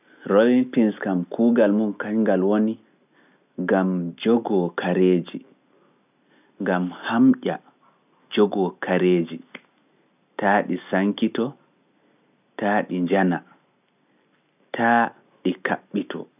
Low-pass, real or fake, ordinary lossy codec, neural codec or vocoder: 3.6 kHz; real; none; none